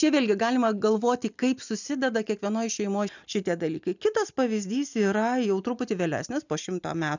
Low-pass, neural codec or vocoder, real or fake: 7.2 kHz; none; real